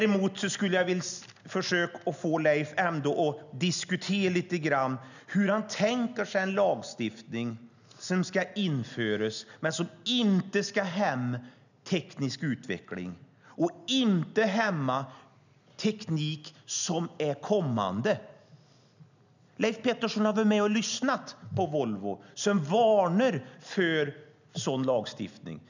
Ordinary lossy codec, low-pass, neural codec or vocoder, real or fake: none; 7.2 kHz; none; real